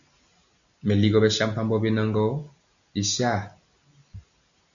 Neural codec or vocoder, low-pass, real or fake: none; 7.2 kHz; real